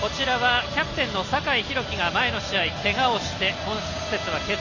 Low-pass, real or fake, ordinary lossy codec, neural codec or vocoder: 7.2 kHz; real; none; none